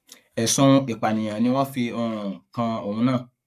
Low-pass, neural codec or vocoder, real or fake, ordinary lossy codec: 14.4 kHz; codec, 44.1 kHz, 7.8 kbps, Pupu-Codec; fake; none